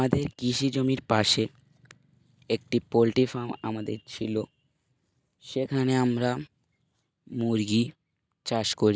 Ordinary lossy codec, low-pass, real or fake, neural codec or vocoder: none; none; real; none